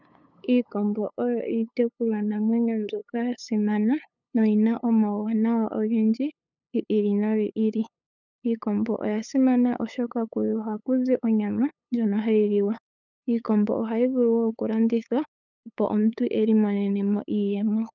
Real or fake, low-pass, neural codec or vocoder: fake; 7.2 kHz; codec, 16 kHz, 8 kbps, FunCodec, trained on LibriTTS, 25 frames a second